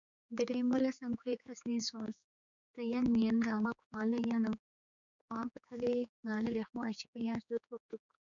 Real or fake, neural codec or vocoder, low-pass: fake; codec, 16 kHz, 4 kbps, X-Codec, HuBERT features, trained on general audio; 7.2 kHz